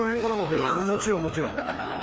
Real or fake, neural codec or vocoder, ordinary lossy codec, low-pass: fake; codec, 16 kHz, 2 kbps, FreqCodec, larger model; none; none